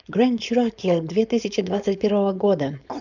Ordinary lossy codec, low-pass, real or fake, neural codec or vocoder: none; 7.2 kHz; fake; codec, 16 kHz, 4.8 kbps, FACodec